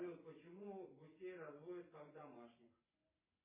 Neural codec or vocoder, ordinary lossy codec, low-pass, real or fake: vocoder, 24 kHz, 100 mel bands, Vocos; MP3, 16 kbps; 3.6 kHz; fake